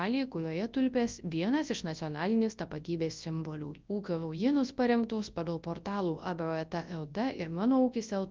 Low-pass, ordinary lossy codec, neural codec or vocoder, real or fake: 7.2 kHz; Opus, 24 kbps; codec, 24 kHz, 0.9 kbps, WavTokenizer, large speech release; fake